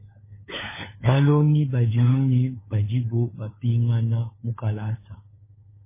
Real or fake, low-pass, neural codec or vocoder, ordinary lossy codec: fake; 3.6 kHz; codec, 16 kHz, 4 kbps, FunCodec, trained on LibriTTS, 50 frames a second; MP3, 16 kbps